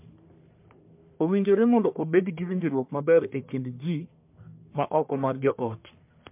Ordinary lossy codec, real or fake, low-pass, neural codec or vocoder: MP3, 32 kbps; fake; 3.6 kHz; codec, 44.1 kHz, 1.7 kbps, Pupu-Codec